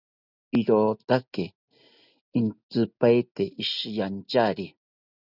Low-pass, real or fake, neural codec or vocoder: 5.4 kHz; real; none